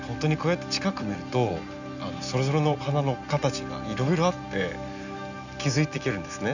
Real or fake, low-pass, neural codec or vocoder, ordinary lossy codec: real; 7.2 kHz; none; none